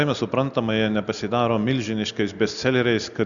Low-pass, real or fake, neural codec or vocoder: 7.2 kHz; real; none